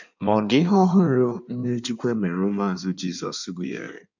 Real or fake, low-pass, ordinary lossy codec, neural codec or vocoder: fake; 7.2 kHz; none; codec, 16 kHz in and 24 kHz out, 1.1 kbps, FireRedTTS-2 codec